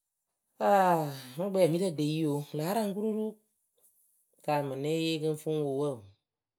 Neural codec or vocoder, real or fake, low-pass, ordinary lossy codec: none; real; none; none